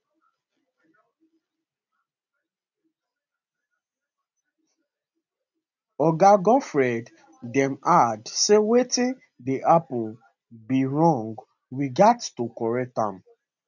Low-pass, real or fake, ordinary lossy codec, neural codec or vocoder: 7.2 kHz; real; none; none